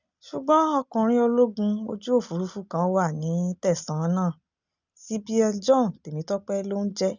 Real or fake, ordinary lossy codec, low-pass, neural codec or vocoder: real; none; 7.2 kHz; none